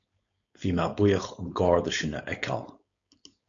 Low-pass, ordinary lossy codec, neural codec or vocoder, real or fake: 7.2 kHz; AAC, 64 kbps; codec, 16 kHz, 4.8 kbps, FACodec; fake